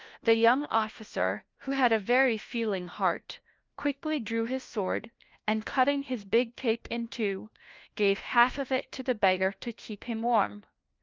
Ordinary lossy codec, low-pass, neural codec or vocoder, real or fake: Opus, 32 kbps; 7.2 kHz; codec, 16 kHz, 1 kbps, FunCodec, trained on LibriTTS, 50 frames a second; fake